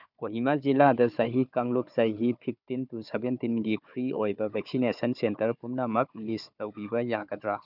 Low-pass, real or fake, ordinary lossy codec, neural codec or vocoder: 5.4 kHz; fake; none; codec, 16 kHz, 4 kbps, FunCodec, trained on Chinese and English, 50 frames a second